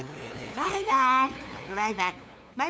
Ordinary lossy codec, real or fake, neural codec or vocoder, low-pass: none; fake; codec, 16 kHz, 2 kbps, FunCodec, trained on LibriTTS, 25 frames a second; none